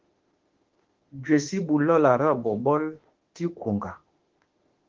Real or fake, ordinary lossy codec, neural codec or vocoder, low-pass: fake; Opus, 16 kbps; autoencoder, 48 kHz, 32 numbers a frame, DAC-VAE, trained on Japanese speech; 7.2 kHz